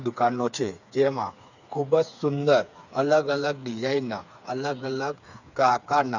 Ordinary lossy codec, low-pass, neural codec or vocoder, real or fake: none; 7.2 kHz; codec, 16 kHz, 4 kbps, FreqCodec, smaller model; fake